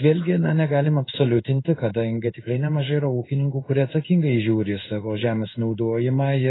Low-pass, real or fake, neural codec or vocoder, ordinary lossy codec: 7.2 kHz; fake; codec, 16 kHz in and 24 kHz out, 1 kbps, XY-Tokenizer; AAC, 16 kbps